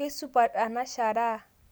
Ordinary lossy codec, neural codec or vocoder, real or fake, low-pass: none; none; real; none